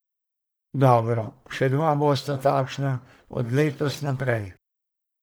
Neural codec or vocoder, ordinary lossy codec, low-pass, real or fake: codec, 44.1 kHz, 1.7 kbps, Pupu-Codec; none; none; fake